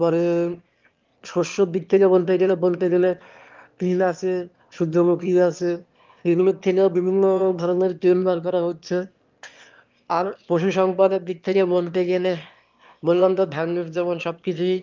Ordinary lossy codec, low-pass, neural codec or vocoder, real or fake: Opus, 32 kbps; 7.2 kHz; autoencoder, 22.05 kHz, a latent of 192 numbers a frame, VITS, trained on one speaker; fake